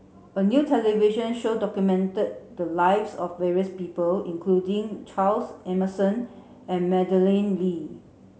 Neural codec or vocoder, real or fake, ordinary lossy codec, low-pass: none; real; none; none